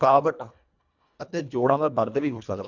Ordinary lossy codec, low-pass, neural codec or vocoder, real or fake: none; 7.2 kHz; codec, 24 kHz, 1.5 kbps, HILCodec; fake